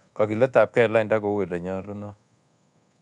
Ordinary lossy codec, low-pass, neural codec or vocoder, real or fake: none; 10.8 kHz; codec, 24 kHz, 1.2 kbps, DualCodec; fake